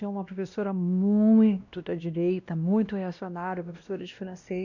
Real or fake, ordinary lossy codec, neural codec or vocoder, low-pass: fake; none; codec, 16 kHz, 1 kbps, X-Codec, WavLM features, trained on Multilingual LibriSpeech; 7.2 kHz